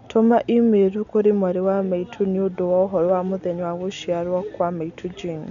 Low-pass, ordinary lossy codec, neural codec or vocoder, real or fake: 7.2 kHz; none; none; real